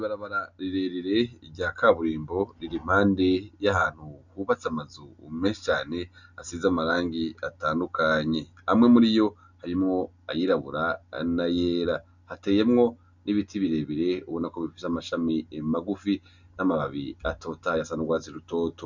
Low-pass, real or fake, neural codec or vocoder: 7.2 kHz; real; none